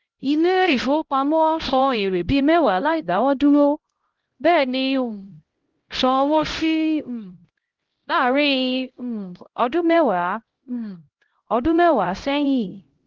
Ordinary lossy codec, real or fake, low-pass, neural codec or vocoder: Opus, 16 kbps; fake; 7.2 kHz; codec, 16 kHz, 0.5 kbps, X-Codec, HuBERT features, trained on LibriSpeech